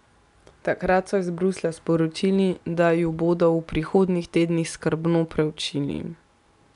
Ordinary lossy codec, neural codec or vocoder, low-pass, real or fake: none; none; 10.8 kHz; real